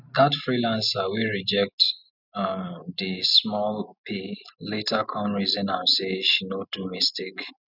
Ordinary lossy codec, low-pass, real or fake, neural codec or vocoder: none; 5.4 kHz; real; none